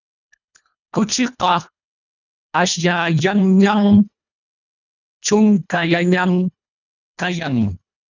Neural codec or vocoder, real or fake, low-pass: codec, 24 kHz, 1.5 kbps, HILCodec; fake; 7.2 kHz